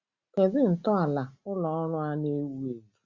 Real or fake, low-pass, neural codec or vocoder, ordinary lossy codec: real; 7.2 kHz; none; Opus, 64 kbps